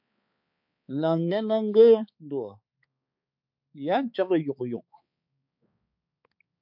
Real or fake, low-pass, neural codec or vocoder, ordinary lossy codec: fake; 5.4 kHz; codec, 16 kHz, 4 kbps, X-Codec, HuBERT features, trained on balanced general audio; MP3, 48 kbps